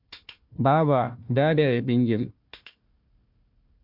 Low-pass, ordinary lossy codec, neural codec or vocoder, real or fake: 5.4 kHz; MP3, 48 kbps; codec, 16 kHz, 1 kbps, FunCodec, trained on Chinese and English, 50 frames a second; fake